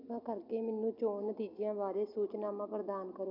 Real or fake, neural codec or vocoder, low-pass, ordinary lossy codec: real; none; 5.4 kHz; MP3, 48 kbps